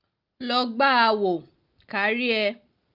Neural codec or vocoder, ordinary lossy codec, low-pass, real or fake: none; Opus, 32 kbps; 5.4 kHz; real